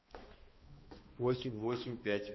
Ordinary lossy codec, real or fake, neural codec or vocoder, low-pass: MP3, 24 kbps; fake; codec, 16 kHz, 2 kbps, X-Codec, HuBERT features, trained on balanced general audio; 7.2 kHz